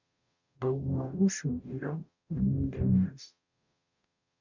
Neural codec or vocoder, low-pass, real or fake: codec, 44.1 kHz, 0.9 kbps, DAC; 7.2 kHz; fake